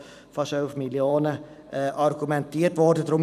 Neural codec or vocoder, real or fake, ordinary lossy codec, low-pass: vocoder, 44.1 kHz, 128 mel bands every 512 samples, BigVGAN v2; fake; none; 14.4 kHz